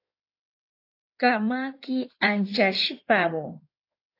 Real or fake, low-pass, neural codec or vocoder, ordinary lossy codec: fake; 5.4 kHz; codec, 16 kHz in and 24 kHz out, 2.2 kbps, FireRedTTS-2 codec; AAC, 32 kbps